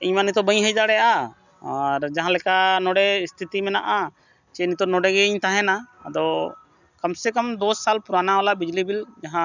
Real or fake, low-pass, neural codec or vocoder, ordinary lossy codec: real; 7.2 kHz; none; none